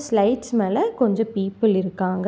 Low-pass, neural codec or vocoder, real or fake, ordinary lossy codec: none; none; real; none